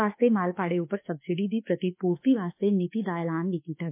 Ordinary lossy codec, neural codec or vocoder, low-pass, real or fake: MP3, 24 kbps; autoencoder, 48 kHz, 32 numbers a frame, DAC-VAE, trained on Japanese speech; 3.6 kHz; fake